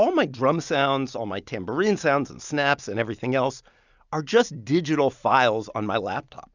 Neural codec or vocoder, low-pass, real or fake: none; 7.2 kHz; real